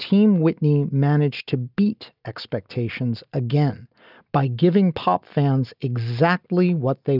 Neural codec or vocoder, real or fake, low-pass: none; real; 5.4 kHz